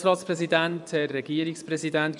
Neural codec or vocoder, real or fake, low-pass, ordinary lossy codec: vocoder, 24 kHz, 100 mel bands, Vocos; fake; 10.8 kHz; none